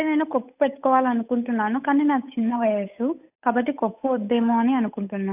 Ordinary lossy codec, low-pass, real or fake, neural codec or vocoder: AAC, 32 kbps; 3.6 kHz; fake; codec, 16 kHz, 8 kbps, FunCodec, trained on Chinese and English, 25 frames a second